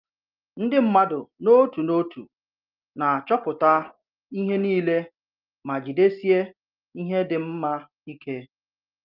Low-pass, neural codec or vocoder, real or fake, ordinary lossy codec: 5.4 kHz; none; real; Opus, 24 kbps